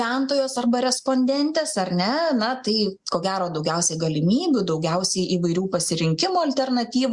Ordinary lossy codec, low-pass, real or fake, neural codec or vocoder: Opus, 64 kbps; 10.8 kHz; real; none